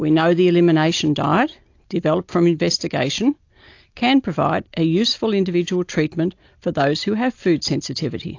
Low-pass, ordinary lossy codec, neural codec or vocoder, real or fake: 7.2 kHz; AAC, 48 kbps; none; real